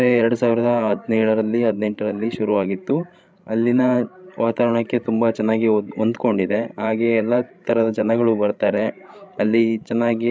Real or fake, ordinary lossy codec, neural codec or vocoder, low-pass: fake; none; codec, 16 kHz, 16 kbps, FreqCodec, larger model; none